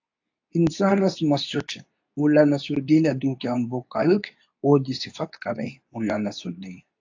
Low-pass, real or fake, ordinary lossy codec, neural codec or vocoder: 7.2 kHz; fake; AAC, 48 kbps; codec, 24 kHz, 0.9 kbps, WavTokenizer, medium speech release version 2